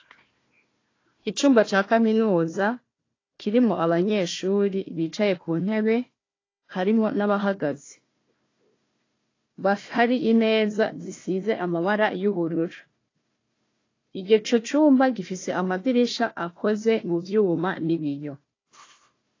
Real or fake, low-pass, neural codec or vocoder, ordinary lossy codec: fake; 7.2 kHz; codec, 16 kHz, 1 kbps, FunCodec, trained on Chinese and English, 50 frames a second; AAC, 32 kbps